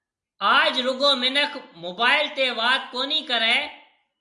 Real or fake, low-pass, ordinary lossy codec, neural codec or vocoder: real; 10.8 kHz; Opus, 64 kbps; none